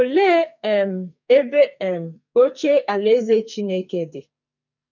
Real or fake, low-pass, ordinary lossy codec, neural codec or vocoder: fake; 7.2 kHz; none; codec, 44.1 kHz, 2.6 kbps, SNAC